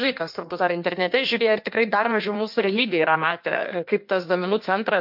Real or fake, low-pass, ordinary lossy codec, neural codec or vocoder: fake; 5.4 kHz; MP3, 48 kbps; codec, 16 kHz in and 24 kHz out, 1.1 kbps, FireRedTTS-2 codec